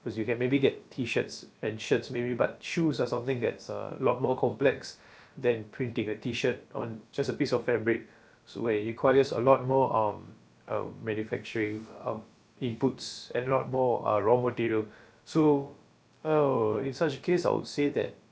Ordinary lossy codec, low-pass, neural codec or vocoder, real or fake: none; none; codec, 16 kHz, about 1 kbps, DyCAST, with the encoder's durations; fake